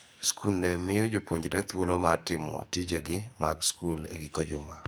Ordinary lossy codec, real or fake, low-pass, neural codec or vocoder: none; fake; none; codec, 44.1 kHz, 2.6 kbps, SNAC